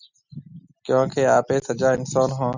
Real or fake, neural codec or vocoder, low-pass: real; none; 7.2 kHz